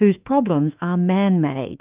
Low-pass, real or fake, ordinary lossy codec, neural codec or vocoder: 3.6 kHz; fake; Opus, 24 kbps; autoencoder, 48 kHz, 32 numbers a frame, DAC-VAE, trained on Japanese speech